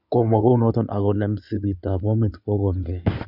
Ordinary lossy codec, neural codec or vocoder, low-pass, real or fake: none; codec, 16 kHz in and 24 kHz out, 2.2 kbps, FireRedTTS-2 codec; 5.4 kHz; fake